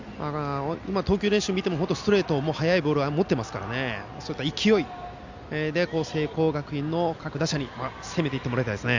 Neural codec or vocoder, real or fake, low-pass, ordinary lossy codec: none; real; 7.2 kHz; Opus, 64 kbps